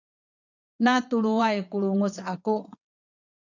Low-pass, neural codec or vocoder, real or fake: 7.2 kHz; vocoder, 22.05 kHz, 80 mel bands, Vocos; fake